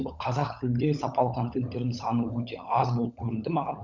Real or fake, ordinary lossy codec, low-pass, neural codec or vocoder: fake; none; 7.2 kHz; codec, 16 kHz, 16 kbps, FunCodec, trained on LibriTTS, 50 frames a second